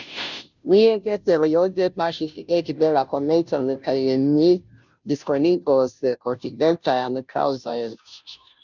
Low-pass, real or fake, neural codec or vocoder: 7.2 kHz; fake; codec, 16 kHz, 0.5 kbps, FunCodec, trained on Chinese and English, 25 frames a second